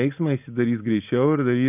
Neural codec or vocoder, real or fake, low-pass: none; real; 3.6 kHz